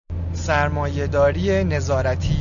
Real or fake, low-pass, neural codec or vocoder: real; 7.2 kHz; none